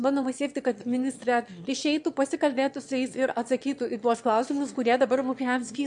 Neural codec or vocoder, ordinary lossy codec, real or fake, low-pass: autoencoder, 22.05 kHz, a latent of 192 numbers a frame, VITS, trained on one speaker; MP3, 64 kbps; fake; 9.9 kHz